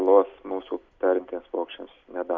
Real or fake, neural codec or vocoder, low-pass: real; none; 7.2 kHz